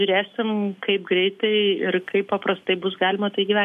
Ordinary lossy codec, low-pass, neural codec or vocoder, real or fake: MP3, 64 kbps; 14.4 kHz; none; real